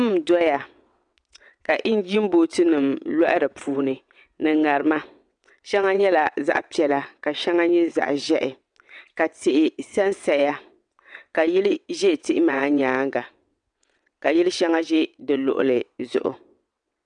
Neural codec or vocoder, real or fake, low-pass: vocoder, 22.05 kHz, 80 mel bands, WaveNeXt; fake; 9.9 kHz